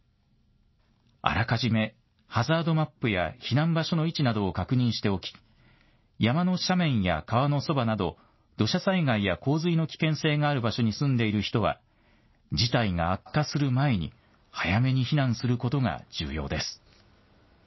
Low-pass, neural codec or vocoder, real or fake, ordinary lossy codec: 7.2 kHz; none; real; MP3, 24 kbps